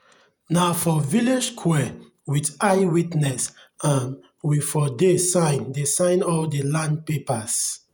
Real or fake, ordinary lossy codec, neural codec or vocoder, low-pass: fake; none; vocoder, 48 kHz, 128 mel bands, Vocos; none